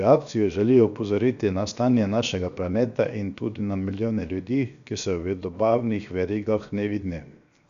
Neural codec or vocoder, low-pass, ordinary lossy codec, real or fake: codec, 16 kHz, 0.7 kbps, FocalCodec; 7.2 kHz; none; fake